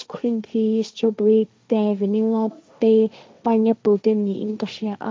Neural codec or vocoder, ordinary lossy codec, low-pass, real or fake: codec, 16 kHz, 1.1 kbps, Voila-Tokenizer; none; none; fake